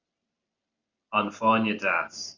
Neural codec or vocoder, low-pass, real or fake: none; 7.2 kHz; real